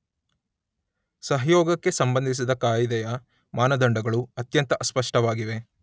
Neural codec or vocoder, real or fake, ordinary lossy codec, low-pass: none; real; none; none